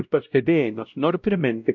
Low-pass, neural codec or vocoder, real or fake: 7.2 kHz; codec, 16 kHz, 0.5 kbps, X-Codec, WavLM features, trained on Multilingual LibriSpeech; fake